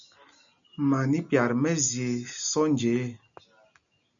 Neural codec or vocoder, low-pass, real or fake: none; 7.2 kHz; real